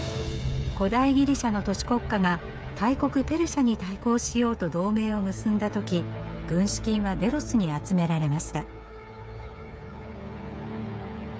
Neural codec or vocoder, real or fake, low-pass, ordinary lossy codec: codec, 16 kHz, 8 kbps, FreqCodec, smaller model; fake; none; none